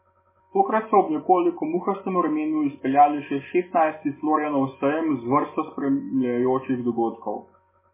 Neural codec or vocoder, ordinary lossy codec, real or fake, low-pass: none; MP3, 16 kbps; real; 3.6 kHz